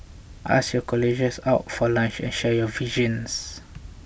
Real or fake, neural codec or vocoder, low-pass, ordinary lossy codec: real; none; none; none